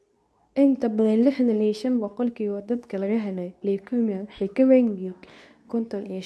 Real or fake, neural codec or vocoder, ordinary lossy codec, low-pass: fake; codec, 24 kHz, 0.9 kbps, WavTokenizer, medium speech release version 2; none; none